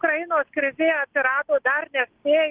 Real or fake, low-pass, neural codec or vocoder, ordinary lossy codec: real; 3.6 kHz; none; Opus, 16 kbps